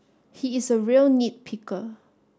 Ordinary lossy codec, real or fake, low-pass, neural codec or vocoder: none; real; none; none